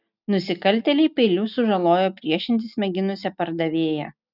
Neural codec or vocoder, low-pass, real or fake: none; 5.4 kHz; real